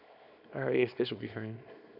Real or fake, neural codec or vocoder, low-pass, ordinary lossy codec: fake; codec, 24 kHz, 0.9 kbps, WavTokenizer, small release; 5.4 kHz; none